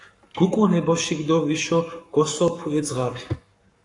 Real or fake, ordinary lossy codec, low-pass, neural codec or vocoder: fake; AAC, 48 kbps; 10.8 kHz; codec, 44.1 kHz, 7.8 kbps, Pupu-Codec